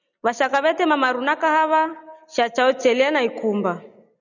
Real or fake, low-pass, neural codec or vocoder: real; 7.2 kHz; none